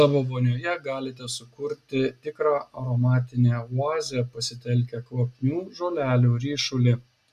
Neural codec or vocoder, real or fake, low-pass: none; real; 14.4 kHz